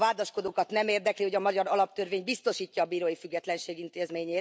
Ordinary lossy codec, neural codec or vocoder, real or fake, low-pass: none; none; real; none